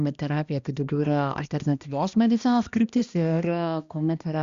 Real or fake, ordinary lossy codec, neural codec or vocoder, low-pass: fake; Opus, 64 kbps; codec, 16 kHz, 1 kbps, X-Codec, HuBERT features, trained on balanced general audio; 7.2 kHz